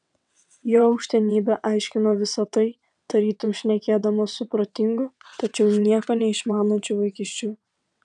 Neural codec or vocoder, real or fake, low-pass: vocoder, 22.05 kHz, 80 mel bands, WaveNeXt; fake; 9.9 kHz